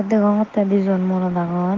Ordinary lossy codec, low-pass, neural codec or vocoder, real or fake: Opus, 16 kbps; 7.2 kHz; none; real